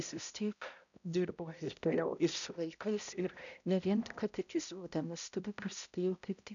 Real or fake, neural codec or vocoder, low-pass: fake; codec, 16 kHz, 0.5 kbps, X-Codec, HuBERT features, trained on balanced general audio; 7.2 kHz